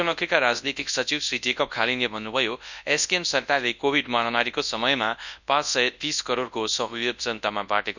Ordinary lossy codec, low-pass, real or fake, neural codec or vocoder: none; 7.2 kHz; fake; codec, 24 kHz, 0.9 kbps, WavTokenizer, large speech release